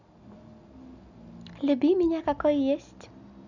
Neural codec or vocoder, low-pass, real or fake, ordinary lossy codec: none; 7.2 kHz; real; none